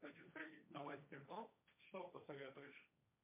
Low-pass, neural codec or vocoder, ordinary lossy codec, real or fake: 3.6 kHz; codec, 16 kHz, 1.1 kbps, Voila-Tokenizer; MP3, 32 kbps; fake